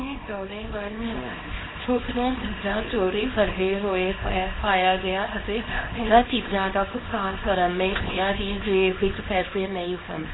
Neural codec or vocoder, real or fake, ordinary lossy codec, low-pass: codec, 24 kHz, 0.9 kbps, WavTokenizer, small release; fake; AAC, 16 kbps; 7.2 kHz